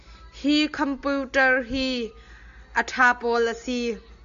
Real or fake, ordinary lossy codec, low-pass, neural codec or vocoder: real; AAC, 96 kbps; 7.2 kHz; none